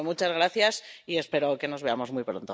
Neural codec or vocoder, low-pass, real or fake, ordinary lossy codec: none; none; real; none